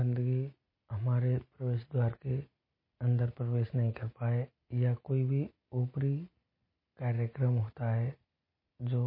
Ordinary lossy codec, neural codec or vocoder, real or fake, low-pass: MP3, 24 kbps; none; real; 5.4 kHz